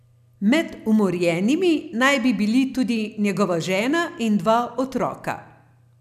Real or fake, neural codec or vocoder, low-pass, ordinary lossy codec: real; none; 14.4 kHz; none